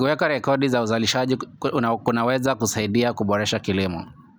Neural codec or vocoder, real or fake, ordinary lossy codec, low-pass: none; real; none; none